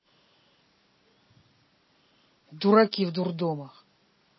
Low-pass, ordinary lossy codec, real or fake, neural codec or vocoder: 7.2 kHz; MP3, 24 kbps; real; none